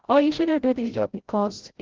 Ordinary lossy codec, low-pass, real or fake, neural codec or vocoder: Opus, 16 kbps; 7.2 kHz; fake; codec, 16 kHz, 0.5 kbps, FreqCodec, larger model